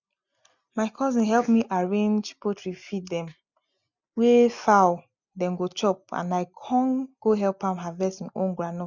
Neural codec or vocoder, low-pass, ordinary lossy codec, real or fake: none; 7.2 kHz; none; real